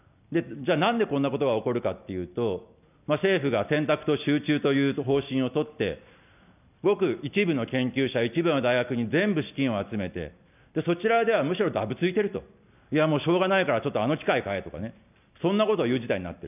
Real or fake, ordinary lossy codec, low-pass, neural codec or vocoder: real; none; 3.6 kHz; none